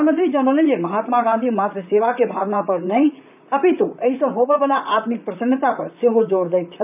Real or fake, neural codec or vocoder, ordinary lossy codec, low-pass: fake; vocoder, 44.1 kHz, 128 mel bands, Pupu-Vocoder; none; 3.6 kHz